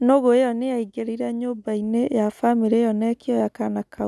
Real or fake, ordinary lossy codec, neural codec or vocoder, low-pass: real; none; none; none